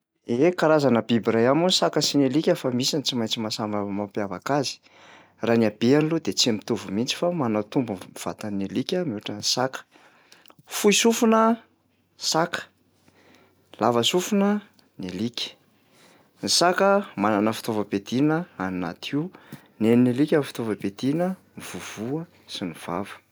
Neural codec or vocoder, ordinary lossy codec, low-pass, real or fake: none; none; none; real